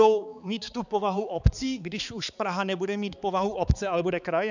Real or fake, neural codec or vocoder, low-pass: fake; codec, 16 kHz, 4 kbps, X-Codec, HuBERT features, trained on balanced general audio; 7.2 kHz